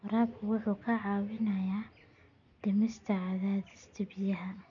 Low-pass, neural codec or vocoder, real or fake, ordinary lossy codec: 7.2 kHz; none; real; none